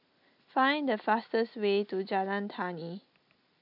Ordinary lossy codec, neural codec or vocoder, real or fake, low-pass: none; none; real; 5.4 kHz